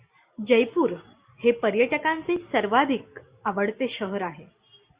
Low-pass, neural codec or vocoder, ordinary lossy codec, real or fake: 3.6 kHz; none; Opus, 64 kbps; real